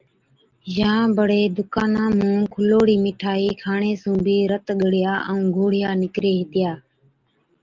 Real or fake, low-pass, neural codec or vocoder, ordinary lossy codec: real; 7.2 kHz; none; Opus, 32 kbps